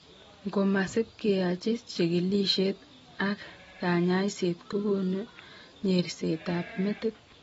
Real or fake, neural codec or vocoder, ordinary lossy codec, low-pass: real; none; AAC, 24 kbps; 19.8 kHz